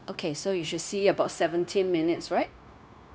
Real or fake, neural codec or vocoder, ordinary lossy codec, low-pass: fake; codec, 16 kHz, 0.9 kbps, LongCat-Audio-Codec; none; none